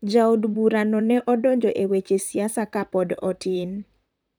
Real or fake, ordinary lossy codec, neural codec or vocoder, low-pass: fake; none; vocoder, 44.1 kHz, 128 mel bands, Pupu-Vocoder; none